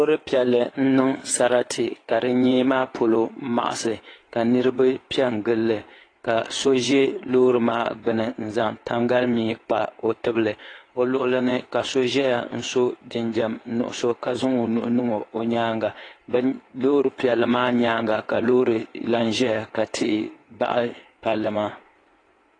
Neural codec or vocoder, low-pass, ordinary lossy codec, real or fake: codec, 16 kHz in and 24 kHz out, 2.2 kbps, FireRedTTS-2 codec; 9.9 kHz; AAC, 32 kbps; fake